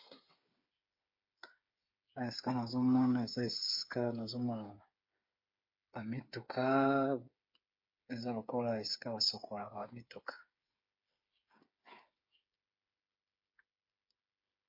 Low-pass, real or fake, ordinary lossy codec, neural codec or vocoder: 5.4 kHz; fake; MP3, 32 kbps; codec, 16 kHz, 8 kbps, FreqCodec, smaller model